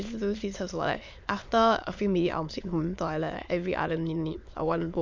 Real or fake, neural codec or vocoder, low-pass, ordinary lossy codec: fake; autoencoder, 22.05 kHz, a latent of 192 numbers a frame, VITS, trained on many speakers; 7.2 kHz; MP3, 64 kbps